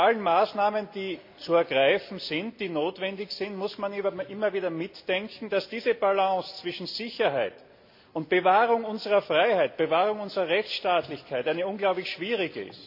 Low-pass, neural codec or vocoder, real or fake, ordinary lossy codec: 5.4 kHz; none; real; MP3, 48 kbps